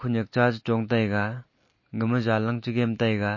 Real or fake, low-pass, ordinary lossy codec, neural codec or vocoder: real; 7.2 kHz; MP3, 32 kbps; none